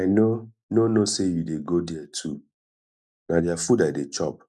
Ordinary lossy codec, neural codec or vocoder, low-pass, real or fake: none; none; none; real